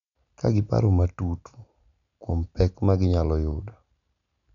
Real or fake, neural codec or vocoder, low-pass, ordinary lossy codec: real; none; 7.2 kHz; none